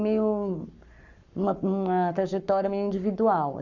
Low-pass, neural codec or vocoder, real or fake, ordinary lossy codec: 7.2 kHz; codec, 44.1 kHz, 7.8 kbps, Pupu-Codec; fake; none